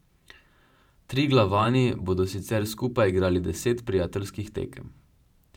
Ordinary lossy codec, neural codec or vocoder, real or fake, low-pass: none; vocoder, 44.1 kHz, 128 mel bands every 512 samples, BigVGAN v2; fake; 19.8 kHz